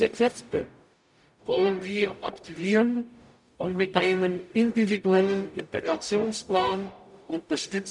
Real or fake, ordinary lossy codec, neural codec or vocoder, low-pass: fake; none; codec, 44.1 kHz, 0.9 kbps, DAC; 10.8 kHz